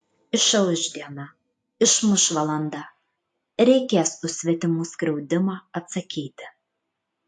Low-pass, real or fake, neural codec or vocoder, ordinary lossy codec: 10.8 kHz; real; none; AAC, 64 kbps